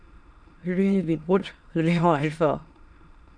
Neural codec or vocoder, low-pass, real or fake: autoencoder, 22.05 kHz, a latent of 192 numbers a frame, VITS, trained on many speakers; 9.9 kHz; fake